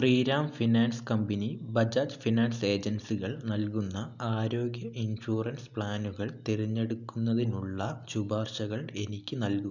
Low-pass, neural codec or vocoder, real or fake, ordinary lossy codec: 7.2 kHz; none; real; none